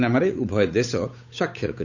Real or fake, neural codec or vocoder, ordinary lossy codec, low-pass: fake; codec, 16 kHz, 8 kbps, FunCodec, trained on Chinese and English, 25 frames a second; none; 7.2 kHz